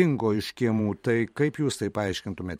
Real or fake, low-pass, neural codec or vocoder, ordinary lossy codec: fake; 19.8 kHz; vocoder, 44.1 kHz, 128 mel bands every 512 samples, BigVGAN v2; MP3, 64 kbps